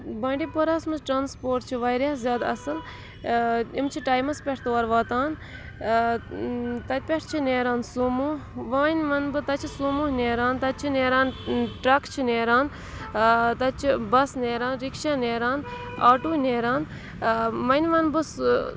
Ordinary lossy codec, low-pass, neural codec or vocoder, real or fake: none; none; none; real